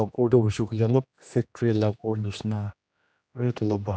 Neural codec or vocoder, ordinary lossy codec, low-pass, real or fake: codec, 16 kHz, 1 kbps, X-Codec, HuBERT features, trained on general audio; none; none; fake